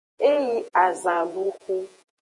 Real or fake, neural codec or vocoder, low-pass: fake; vocoder, 48 kHz, 128 mel bands, Vocos; 10.8 kHz